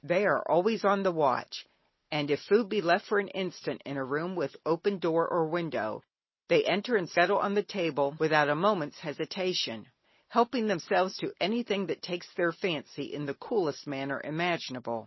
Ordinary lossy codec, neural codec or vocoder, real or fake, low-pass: MP3, 24 kbps; none; real; 7.2 kHz